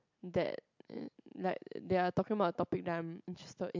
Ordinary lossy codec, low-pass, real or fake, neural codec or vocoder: MP3, 64 kbps; 7.2 kHz; real; none